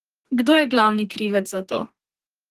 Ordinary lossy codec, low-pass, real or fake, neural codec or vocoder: Opus, 16 kbps; 14.4 kHz; fake; codec, 32 kHz, 1.9 kbps, SNAC